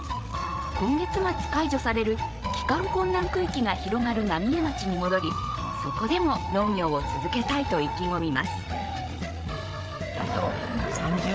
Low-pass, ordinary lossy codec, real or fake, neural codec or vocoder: none; none; fake; codec, 16 kHz, 8 kbps, FreqCodec, larger model